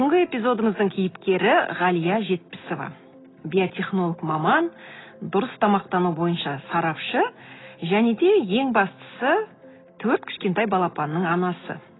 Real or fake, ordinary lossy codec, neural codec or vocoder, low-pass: real; AAC, 16 kbps; none; 7.2 kHz